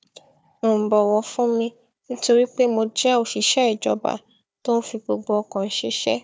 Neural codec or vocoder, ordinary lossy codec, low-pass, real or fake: codec, 16 kHz, 4 kbps, FunCodec, trained on Chinese and English, 50 frames a second; none; none; fake